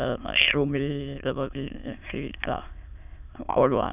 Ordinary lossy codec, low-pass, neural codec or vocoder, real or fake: none; 3.6 kHz; autoencoder, 22.05 kHz, a latent of 192 numbers a frame, VITS, trained on many speakers; fake